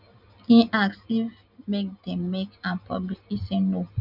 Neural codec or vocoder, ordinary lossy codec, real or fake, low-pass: none; none; real; 5.4 kHz